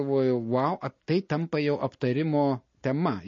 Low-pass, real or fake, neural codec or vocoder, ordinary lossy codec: 7.2 kHz; real; none; MP3, 32 kbps